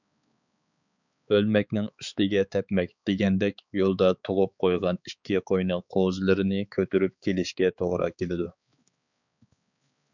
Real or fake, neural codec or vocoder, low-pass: fake; codec, 16 kHz, 4 kbps, X-Codec, HuBERT features, trained on balanced general audio; 7.2 kHz